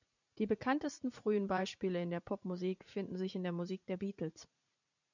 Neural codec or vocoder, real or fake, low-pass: vocoder, 44.1 kHz, 80 mel bands, Vocos; fake; 7.2 kHz